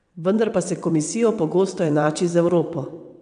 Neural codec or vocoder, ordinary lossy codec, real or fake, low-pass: vocoder, 22.05 kHz, 80 mel bands, WaveNeXt; AAC, 96 kbps; fake; 9.9 kHz